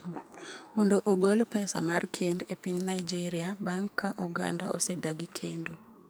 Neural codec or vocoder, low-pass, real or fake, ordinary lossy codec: codec, 44.1 kHz, 2.6 kbps, SNAC; none; fake; none